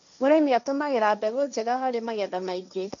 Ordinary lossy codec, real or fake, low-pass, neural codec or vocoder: none; fake; 7.2 kHz; codec, 16 kHz, 1.1 kbps, Voila-Tokenizer